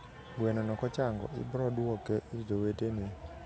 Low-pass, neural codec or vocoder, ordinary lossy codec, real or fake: none; none; none; real